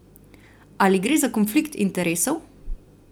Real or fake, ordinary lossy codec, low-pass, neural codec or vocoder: fake; none; none; vocoder, 44.1 kHz, 128 mel bands every 256 samples, BigVGAN v2